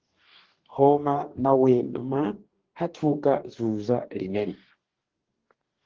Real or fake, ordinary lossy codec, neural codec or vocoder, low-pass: fake; Opus, 16 kbps; codec, 44.1 kHz, 2.6 kbps, DAC; 7.2 kHz